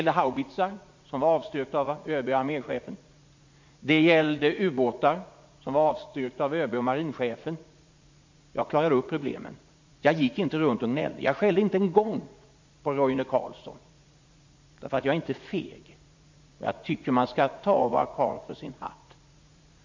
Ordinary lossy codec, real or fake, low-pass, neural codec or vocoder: MP3, 48 kbps; fake; 7.2 kHz; vocoder, 44.1 kHz, 80 mel bands, Vocos